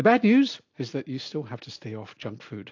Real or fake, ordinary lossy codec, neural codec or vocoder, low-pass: real; AAC, 48 kbps; none; 7.2 kHz